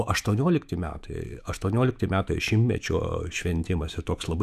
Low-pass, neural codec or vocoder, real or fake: 14.4 kHz; autoencoder, 48 kHz, 128 numbers a frame, DAC-VAE, trained on Japanese speech; fake